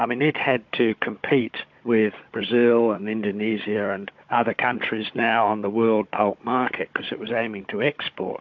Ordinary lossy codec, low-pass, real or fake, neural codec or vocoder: MP3, 48 kbps; 7.2 kHz; fake; codec, 16 kHz, 16 kbps, FunCodec, trained on Chinese and English, 50 frames a second